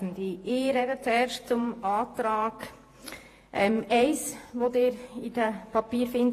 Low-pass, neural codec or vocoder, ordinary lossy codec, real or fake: 14.4 kHz; vocoder, 48 kHz, 128 mel bands, Vocos; AAC, 48 kbps; fake